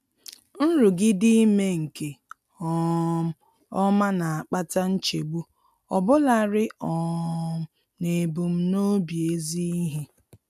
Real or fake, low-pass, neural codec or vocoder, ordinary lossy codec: real; 14.4 kHz; none; none